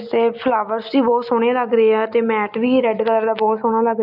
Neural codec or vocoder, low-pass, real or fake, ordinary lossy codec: none; 5.4 kHz; real; none